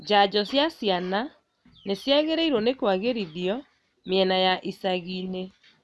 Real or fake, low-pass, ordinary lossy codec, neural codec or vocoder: real; none; none; none